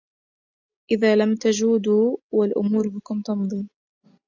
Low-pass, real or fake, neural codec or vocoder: 7.2 kHz; real; none